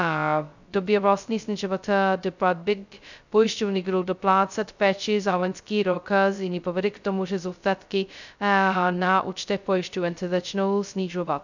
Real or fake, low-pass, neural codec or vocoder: fake; 7.2 kHz; codec, 16 kHz, 0.2 kbps, FocalCodec